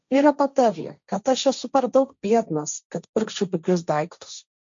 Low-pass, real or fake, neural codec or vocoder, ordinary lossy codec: 7.2 kHz; fake; codec, 16 kHz, 1.1 kbps, Voila-Tokenizer; MP3, 48 kbps